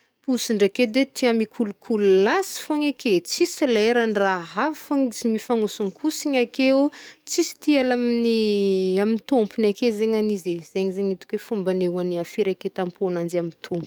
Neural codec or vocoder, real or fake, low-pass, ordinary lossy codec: codec, 44.1 kHz, 7.8 kbps, DAC; fake; none; none